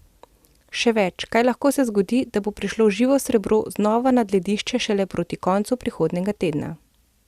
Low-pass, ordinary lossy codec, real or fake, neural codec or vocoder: 14.4 kHz; Opus, 64 kbps; real; none